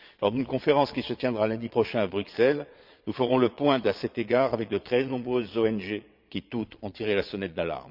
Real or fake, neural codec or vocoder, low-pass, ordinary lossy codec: fake; vocoder, 22.05 kHz, 80 mel bands, Vocos; 5.4 kHz; Opus, 64 kbps